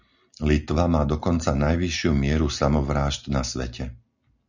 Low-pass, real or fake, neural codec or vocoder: 7.2 kHz; real; none